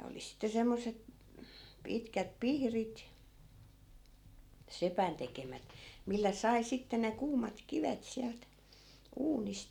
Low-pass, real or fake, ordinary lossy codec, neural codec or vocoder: 19.8 kHz; real; none; none